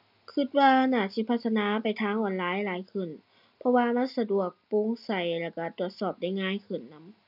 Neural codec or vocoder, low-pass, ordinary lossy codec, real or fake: none; 5.4 kHz; none; real